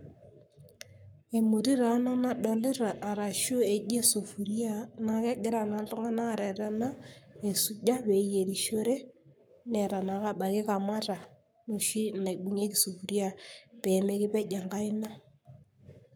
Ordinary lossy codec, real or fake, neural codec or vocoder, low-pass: none; fake; codec, 44.1 kHz, 7.8 kbps, Pupu-Codec; none